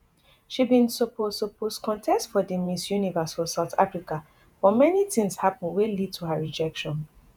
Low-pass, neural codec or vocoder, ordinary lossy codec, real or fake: none; vocoder, 48 kHz, 128 mel bands, Vocos; none; fake